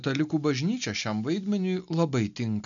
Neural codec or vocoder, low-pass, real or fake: none; 7.2 kHz; real